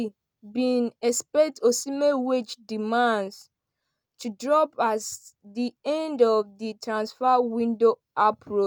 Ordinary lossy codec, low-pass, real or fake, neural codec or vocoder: none; none; real; none